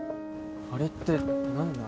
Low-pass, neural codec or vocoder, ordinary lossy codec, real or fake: none; none; none; real